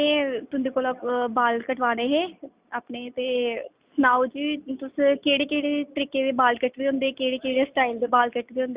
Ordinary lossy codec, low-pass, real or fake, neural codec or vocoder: Opus, 64 kbps; 3.6 kHz; real; none